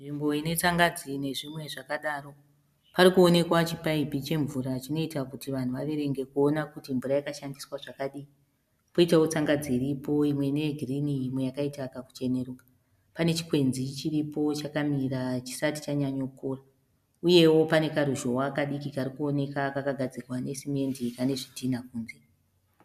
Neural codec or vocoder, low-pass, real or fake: none; 14.4 kHz; real